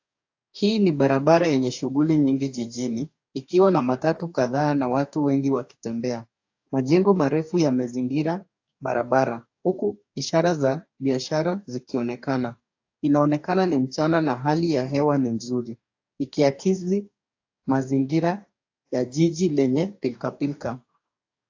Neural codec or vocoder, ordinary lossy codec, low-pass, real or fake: codec, 44.1 kHz, 2.6 kbps, DAC; AAC, 48 kbps; 7.2 kHz; fake